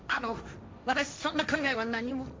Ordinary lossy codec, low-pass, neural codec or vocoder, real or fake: none; none; codec, 16 kHz, 1.1 kbps, Voila-Tokenizer; fake